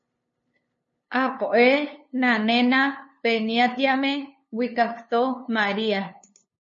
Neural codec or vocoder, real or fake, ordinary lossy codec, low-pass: codec, 16 kHz, 2 kbps, FunCodec, trained on LibriTTS, 25 frames a second; fake; MP3, 32 kbps; 7.2 kHz